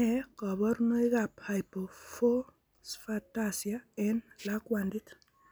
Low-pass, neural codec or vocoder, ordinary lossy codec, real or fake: none; none; none; real